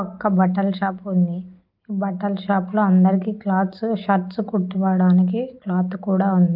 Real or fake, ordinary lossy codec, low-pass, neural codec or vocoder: real; Opus, 24 kbps; 5.4 kHz; none